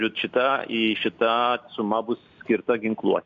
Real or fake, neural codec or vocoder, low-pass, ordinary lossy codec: real; none; 7.2 kHz; AAC, 64 kbps